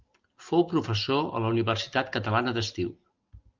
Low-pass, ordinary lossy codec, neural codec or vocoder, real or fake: 7.2 kHz; Opus, 32 kbps; none; real